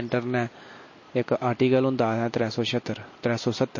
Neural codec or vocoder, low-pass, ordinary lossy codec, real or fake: none; 7.2 kHz; MP3, 32 kbps; real